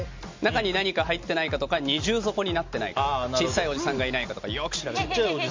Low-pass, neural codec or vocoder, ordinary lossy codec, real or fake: 7.2 kHz; none; MP3, 48 kbps; real